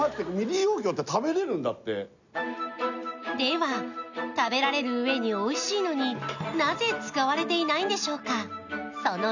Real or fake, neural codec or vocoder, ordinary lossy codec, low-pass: real; none; none; 7.2 kHz